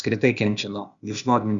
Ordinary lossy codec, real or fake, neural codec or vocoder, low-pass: Opus, 64 kbps; fake; codec, 16 kHz, 0.8 kbps, ZipCodec; 7.2 kHz